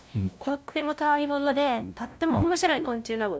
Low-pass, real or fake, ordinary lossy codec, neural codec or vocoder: none; fake; none; codec, 16 kHz, 0.5 kbps, FunCodec, trained on LibriTTS, 25 frames a second